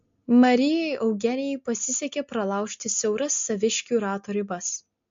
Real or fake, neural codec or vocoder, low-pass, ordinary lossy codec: real; none; 7.2 kHz; MP3, 48 kbps